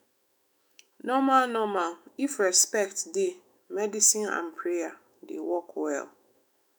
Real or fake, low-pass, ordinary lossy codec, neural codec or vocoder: fake; none; none; autoencoder, 48 kHz, 128 numbers a frame, DAC-VAE, trained on Japanese speech